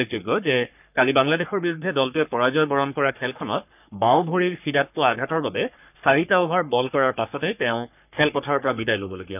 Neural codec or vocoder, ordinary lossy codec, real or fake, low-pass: codec, 44.1 kHz, 3.4 kbps, Pupu-Codec; none; fake; 3.6 kHz